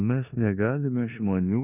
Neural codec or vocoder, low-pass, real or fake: codec, 16 kHz in and 24 kHz out, 0.9 kbps, LongCat-Audio-Codec, four codebook decoder; 3.6 kHz; fake